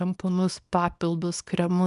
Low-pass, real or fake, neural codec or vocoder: 10.8 kHz; fake; codec, 24 kHz, 0.9 kbps, WavTokenizer, small release